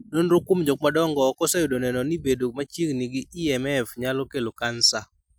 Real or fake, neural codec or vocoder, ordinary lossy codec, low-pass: real; none; none; none